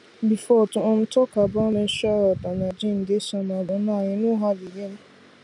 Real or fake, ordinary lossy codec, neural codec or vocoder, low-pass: real; none; none; 10.8 kHz